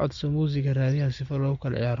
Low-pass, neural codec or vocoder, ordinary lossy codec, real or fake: 7.2 kHz; none; MP3, 48 kbps; real